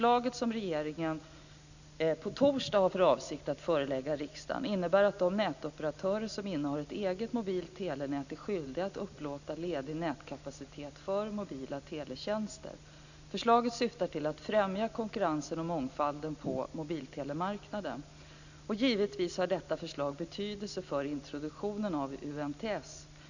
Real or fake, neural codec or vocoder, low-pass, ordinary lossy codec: real; none; 7.2 kHz; none